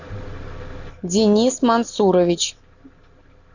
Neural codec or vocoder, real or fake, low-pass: none; real; 7.2 kHz